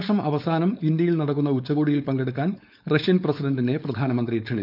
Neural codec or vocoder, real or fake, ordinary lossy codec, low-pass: codec, 16 kHz, 4.8 kbps, FACodec; fake; none; 5.4 kHz